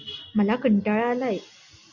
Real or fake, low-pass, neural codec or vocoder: real; 7.2 kHz; none